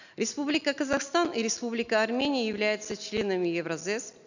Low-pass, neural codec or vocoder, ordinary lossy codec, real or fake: 7.2 kHz; none; none; real